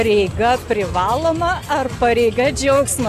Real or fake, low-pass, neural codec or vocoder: real; 14.4 kHz; none